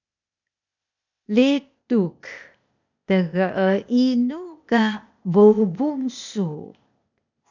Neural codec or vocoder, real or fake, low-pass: codec, 16 kHz, 0.8 kbps, ZipCodec; fake; 7.2 kHz